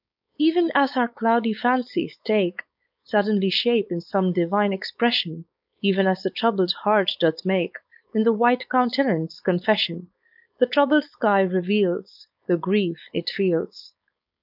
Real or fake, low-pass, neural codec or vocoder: fake; 5.4 kHz; codec, 16 kHz, 4.8 kbps, FACodec